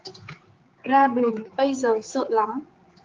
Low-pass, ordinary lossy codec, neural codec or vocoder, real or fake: 7.2 kHz; Opus, 32 kbps; codec, 16 kHz, 4 kbps, X-Codec, HuBERT features, trained on general audio; fake